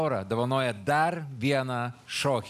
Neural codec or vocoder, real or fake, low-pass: none; real; 14.4 kHz